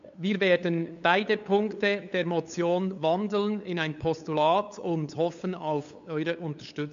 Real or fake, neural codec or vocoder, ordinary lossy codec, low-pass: fake; codec, 16 kHz, 8 kbps, FunCodec, trained on LibriTTS, 25 frames a second; MP3, 64 kbps; 7.2 kHz